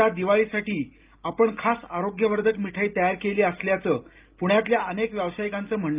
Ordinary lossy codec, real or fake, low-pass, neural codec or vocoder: Opus, 32 kbps; real; 3.6 kHz; none